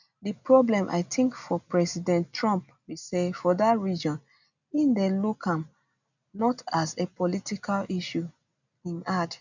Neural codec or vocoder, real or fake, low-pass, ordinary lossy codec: none; real; 7.2 kHz; none